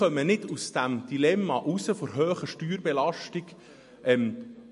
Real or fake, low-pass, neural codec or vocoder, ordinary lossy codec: real; 14.4 kHz; none; MP3, 48 kbps